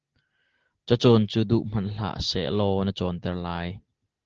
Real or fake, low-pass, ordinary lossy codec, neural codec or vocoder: real; 7.2 kHz; Opus, 16 kbps; none